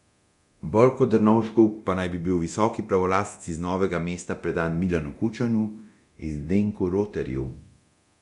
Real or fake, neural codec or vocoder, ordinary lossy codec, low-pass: fake; codec, 24 kHz, 0.9 kbps, DualCodec; none; 10.8 kHz